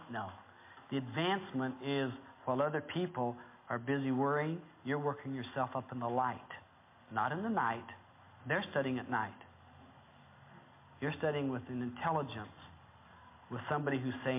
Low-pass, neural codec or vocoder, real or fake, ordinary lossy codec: 3.6 kHz; none; real; MP3, 24 kbps